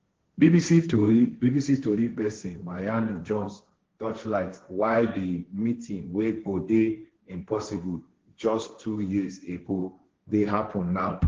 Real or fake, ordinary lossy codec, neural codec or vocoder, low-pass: fake; Opus, 16 kbps; codec, 16 kHz, 1.1 kbps, Voila-Tokenizer; 7.2 kHz